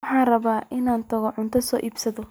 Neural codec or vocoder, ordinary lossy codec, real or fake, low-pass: none; none; real; none